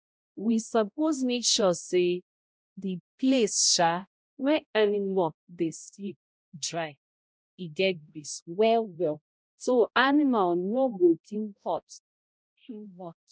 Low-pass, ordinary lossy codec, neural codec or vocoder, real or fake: none; none; codec, 16 kHz, 0.5 kbps, X-Codec, HuBERT features, trained on balanced general audio; fake